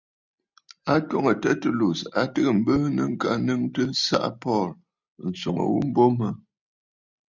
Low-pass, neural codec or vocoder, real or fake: 7.2 kHz; none; real